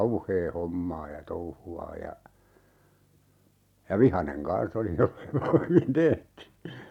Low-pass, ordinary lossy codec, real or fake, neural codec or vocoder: 19.8 kHz; none; real; none